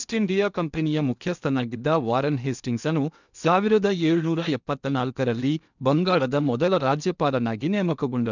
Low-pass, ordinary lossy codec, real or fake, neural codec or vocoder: 7.2 kHz; none; fake; codec, 16 kHz in and 24 kHz out, 0.8 kbps, FocalCodec, streaming, 65536 codes